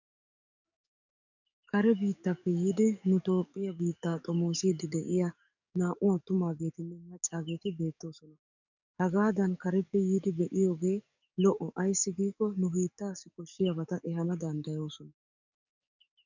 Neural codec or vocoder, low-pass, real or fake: codec, 44.1 kHz, 7.8 kbps, DAC; 7.2 kHz; fake